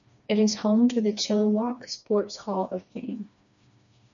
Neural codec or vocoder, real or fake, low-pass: codec, 16 kHz, 2 kbps, FreqCodec, smaller model; fake; 7.2 kHz